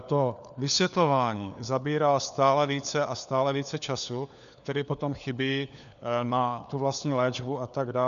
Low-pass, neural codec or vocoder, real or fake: 7.2 kHz; codec, 16 kHz, 4 kbps, FunCodec, trained on LibriTTS, 50 frames a second; fake